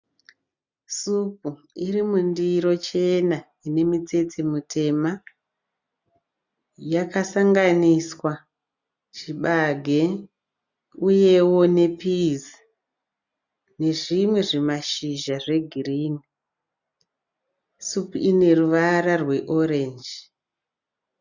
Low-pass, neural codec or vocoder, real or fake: 7.2 kHz; none; real